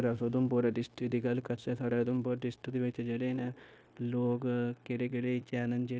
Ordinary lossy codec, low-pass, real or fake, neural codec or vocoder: none; none; fake; codec, 16 kHz, 0.9 kbps, LongCat-Audio-Codec